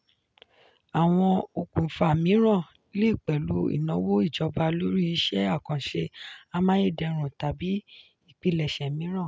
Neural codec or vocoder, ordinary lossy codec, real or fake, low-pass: none; none; real; none